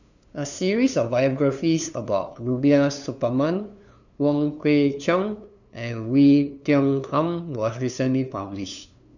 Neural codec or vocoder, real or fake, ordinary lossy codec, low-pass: codec, 16 kHz, 2 kbps, FunCodec, trained on LibriTTS, 25 frames a second; fake; none; 7.2 kHz